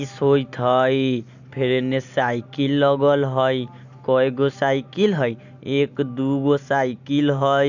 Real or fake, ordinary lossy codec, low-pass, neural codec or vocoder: real; none; 7.2 kHz; none